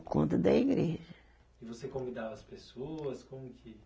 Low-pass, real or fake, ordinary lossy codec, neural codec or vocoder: none; real; none; none